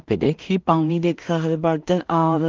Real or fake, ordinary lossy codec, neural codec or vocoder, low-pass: fake; Opus, 32 kbps; codec, 16 kHz in and 24 kHz out, 0.4 kbps, LongCat-Audio-Codec, two codebook decoder; 7.2 kHz